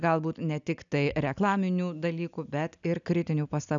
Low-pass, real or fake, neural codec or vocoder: 7.2 kHz; real; none